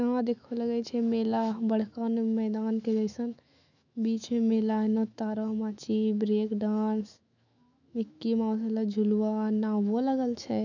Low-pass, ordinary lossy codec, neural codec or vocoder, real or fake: 7.2 kHz; none; autoencoder, 48 kHz, 128 numbers a frame, DAC-VAE, trained on Japanese speech; fake